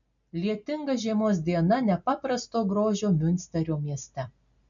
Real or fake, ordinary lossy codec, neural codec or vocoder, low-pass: real; MP3, 96 kbps; none; 7.2 kHz